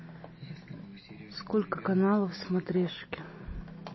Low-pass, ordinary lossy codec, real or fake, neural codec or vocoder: 7.2 kHz; MP3, 24 kbps; real; none